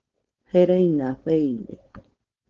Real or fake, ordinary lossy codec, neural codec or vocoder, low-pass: fake; Opus, 16 kbps; codec, 16 kHz, 4.8 kbps, FACodec; 7.2 kHz